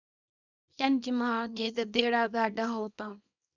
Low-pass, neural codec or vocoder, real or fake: 7.2 kHz; codec, 24 kHz, 0.9 kbps, WavTokenizer, small release; fake